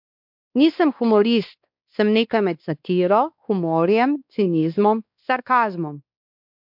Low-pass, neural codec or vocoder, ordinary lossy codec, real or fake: 5.4 kHz; codec, 16 kHz, 2 kbps, X-Codec, WavLM features, trained on Multilingual LibriSpeech; MP3, 48 kbps; fake